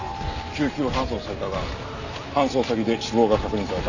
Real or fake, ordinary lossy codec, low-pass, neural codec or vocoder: real; none; 7.2 kHz; none